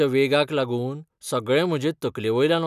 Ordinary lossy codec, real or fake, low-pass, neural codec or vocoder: none; real; 14.4 kHz; none